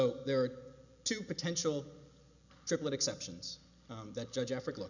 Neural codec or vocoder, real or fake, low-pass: none; real; 7.2 kHz